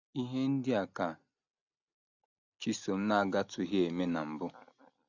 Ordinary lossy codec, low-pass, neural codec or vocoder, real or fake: none; 7.2 kHz; none; real